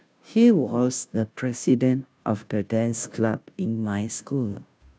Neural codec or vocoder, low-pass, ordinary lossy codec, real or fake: codec, 16 kHz, 0.5 kbps, FunCodec, trained on Chinese and English, 25 frames a second; none; none; fake